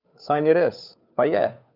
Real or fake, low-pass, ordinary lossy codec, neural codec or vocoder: fake; 5.4 kHz; none; codec, 16 kHz, 2 kbps, FunCodec, trained on Chinese and English, 25 frames a second